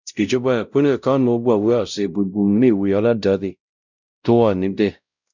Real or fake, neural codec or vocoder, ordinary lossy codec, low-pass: fake; codec, 16 kHz, 0.5 kbps, X-Codec, WavLM features, trained on Multilingual LibriSpeech; none; 7.2 kHz